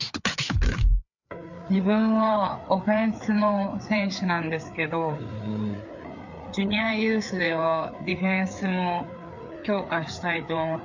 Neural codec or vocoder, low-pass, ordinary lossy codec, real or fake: codec, 16 kHz, 4 kbps, FreqCodec, larger model; 7.2 kHz; none; fake